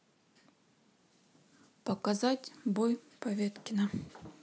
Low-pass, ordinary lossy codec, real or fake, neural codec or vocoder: none; none; real; none